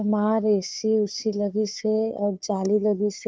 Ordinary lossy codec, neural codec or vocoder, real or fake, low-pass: none; codec, 16 kHz, 4 kbps, FunCodec, trained on Chinese and English, 50 frames a second; fake; none